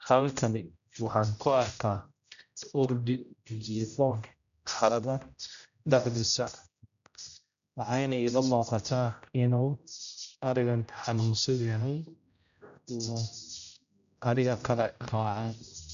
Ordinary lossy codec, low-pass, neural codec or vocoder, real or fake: none; 7.2 kHz; codec, 16 kHz, 0.5 kbps, X-Codec, HuBERT features, trained on general audio; fake